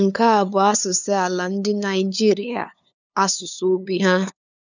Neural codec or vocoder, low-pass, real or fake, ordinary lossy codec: codec, 16 kHz, 2 kbps, FunCodec, trained on LibriTTS, 25 frames a second; 7.2 kHz; fake; none